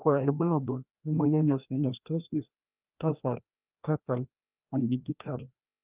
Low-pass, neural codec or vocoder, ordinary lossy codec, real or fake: 3.6 kHz; codec, 16 kHz, 1 kbps, FreqCodec, larger model; Opus, 24 kbps; fake